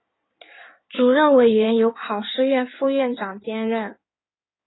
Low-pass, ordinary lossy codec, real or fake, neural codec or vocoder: 7.2 kHz; AAC, 16 kbps; fake; codec, 16 kHz in and 24 kHz out, 2.2 kbps, FireRedTTS-2 codec